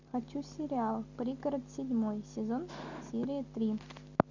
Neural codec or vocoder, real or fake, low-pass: none; real; 7.2 kHz